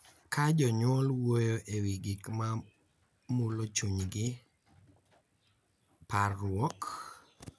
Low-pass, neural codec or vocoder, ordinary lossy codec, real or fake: none; none; none; real